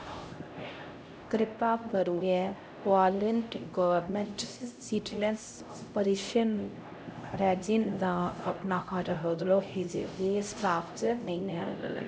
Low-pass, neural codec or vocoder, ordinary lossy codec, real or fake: none; codec, 16 kHz, 0.5 kbps, X-Codec, HuBERT features, trained on LibriSpeech; none; fake